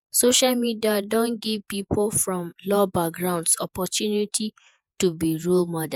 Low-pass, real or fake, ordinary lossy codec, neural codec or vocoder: none; fake; none; vocoder, 48 kHz, 128 mel bands, Vocos